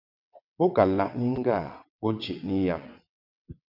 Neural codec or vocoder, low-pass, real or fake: vocoder, 22.05 kHz, 80 mel bands, WaveNeXt; 5.4 kHz; fake